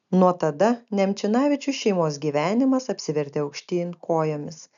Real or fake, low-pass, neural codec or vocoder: real; 7.2 kHz; none